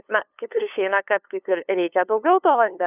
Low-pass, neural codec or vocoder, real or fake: 3.6 kHz; codec, 16 kHz, 2 kbps, FunCodec, trained on LibriTTS, 25 frames a second; fake